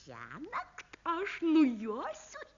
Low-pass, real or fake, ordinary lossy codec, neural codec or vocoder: 7.2 kHz; real; Opus, 64 kbps; none